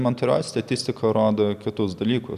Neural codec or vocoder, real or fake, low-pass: none; real; 14.4 kHz